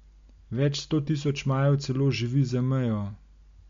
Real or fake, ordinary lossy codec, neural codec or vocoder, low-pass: real; MP3, 48 kbps; none; 7.2 kHz